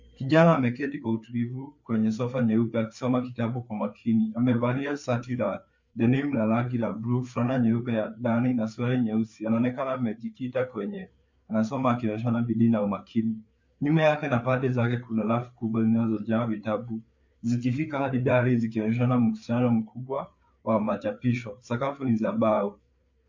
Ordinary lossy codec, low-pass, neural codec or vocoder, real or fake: MP3, 48 kbps; 7.2 kHz; codec, 16 kHz, 4 kbps, FreqCodec, larger model; fake